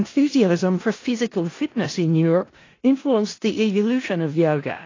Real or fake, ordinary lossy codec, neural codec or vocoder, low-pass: fake; AAC, 32 kbps; codec, 16 kHz in and 24 kHz out, 0.4 kbps, LongCat-Audio-Codec, four codebook decoder; 7.2 kHz